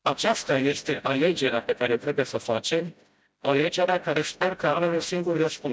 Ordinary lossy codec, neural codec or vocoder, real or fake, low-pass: none; codec, 16 kHz, 0.5 kbps, FreqCodec, smaller model; fake; none